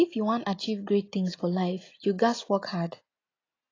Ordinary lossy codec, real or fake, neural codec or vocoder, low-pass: AAC, 32 kbps; real; none; 7.2 kHz